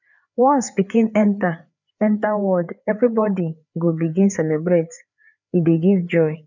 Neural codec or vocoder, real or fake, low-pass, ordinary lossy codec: codec, 16 kHz, 4 kbps, FreqCodec, larger model; fake; 7.2 kHz; none